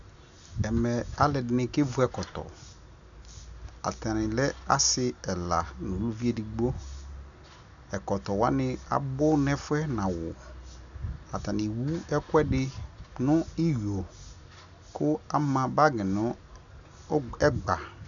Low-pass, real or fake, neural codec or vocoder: 7.2 kHz; real; none